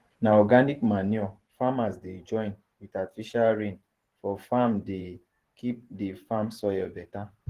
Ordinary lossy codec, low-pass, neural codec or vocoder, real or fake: Opus, 16 kbps; 14.4 kHz; vocoder, 44.1 kHz, 128 mel bands every 512 samples, BigVGAN v2; fake